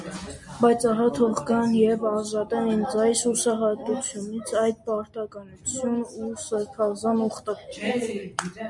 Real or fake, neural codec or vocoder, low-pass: real; none; 10.8 kHz